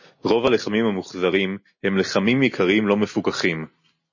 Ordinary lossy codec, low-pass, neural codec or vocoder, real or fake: MP3, 32 kbps; 7.2 kHz; none; real